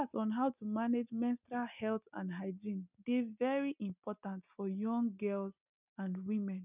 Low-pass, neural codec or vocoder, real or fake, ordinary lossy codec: 3.6 kHz; none; real; none